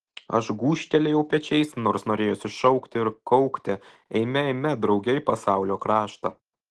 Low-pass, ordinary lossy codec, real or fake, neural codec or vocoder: 10.8 kHz; Opus, 16 kbps; real; none